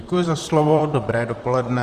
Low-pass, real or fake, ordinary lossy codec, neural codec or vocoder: 14.4 kHz; fake; Opus, 32 kbps; vocoder, 44.1 kHz, 128 mel bands, Pupu-Vocoder